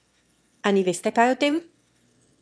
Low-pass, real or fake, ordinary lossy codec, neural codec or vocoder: none; fake; none; autoencoder, 22.05 kHz, a latent of 192 numbers a frame, VITS, trained on one speaker